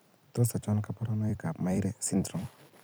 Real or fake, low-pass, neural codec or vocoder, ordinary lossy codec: fake; none; vocoder, 44.1 kHz, 128 mel bands every 256 samples, BigVGAN v2; none